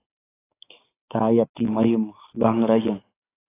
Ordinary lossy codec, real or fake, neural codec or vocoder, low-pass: AAC, 16 kbps; fake; codec, 16 kHz, 6 kbps, DAC; 3.6 kHz